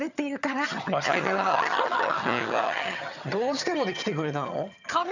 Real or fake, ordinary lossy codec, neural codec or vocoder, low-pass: fake; none; vocoder, 22.05 kHz, 80 mel bands, HiFi-GAN; 7.2 kHz